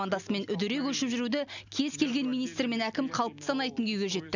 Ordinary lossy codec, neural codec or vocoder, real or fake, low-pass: none; none; real; 7.2 kHz